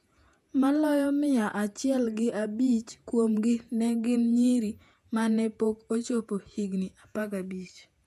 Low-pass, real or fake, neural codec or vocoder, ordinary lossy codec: 14.4 kHz; fake; vocoder, 48 kHz, 128 mel bands, Vocos; none